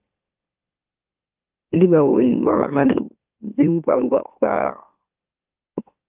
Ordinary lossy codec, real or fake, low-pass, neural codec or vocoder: Opus, 24 kbps; fake; 3.6 kHz; autoencoder, 44.1 kHz, a latent of 192 numbers a frame, MeloTTS